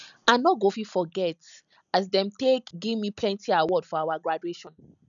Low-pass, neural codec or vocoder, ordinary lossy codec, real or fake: 7.2 kHz; none; none; real